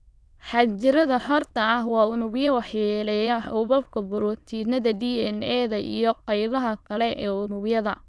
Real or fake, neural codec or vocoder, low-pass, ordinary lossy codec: fake; autoencoder, 22.05 kHz, a latent of 192 numbers a frame, VITS, trained on many speakers; none; none